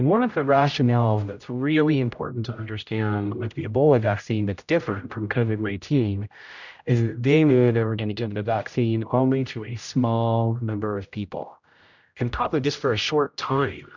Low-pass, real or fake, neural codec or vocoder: 7.2 kHz; fake; codec, 16 kHz, 0.5 kbps, X-Codec, HuBERT features, trained on general audio